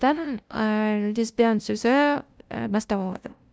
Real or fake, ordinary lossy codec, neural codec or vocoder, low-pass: fake; none; codec, 16 kHz, 0.5 kbps, FunCodec, trained on LibriTTS, 25 frames a second; none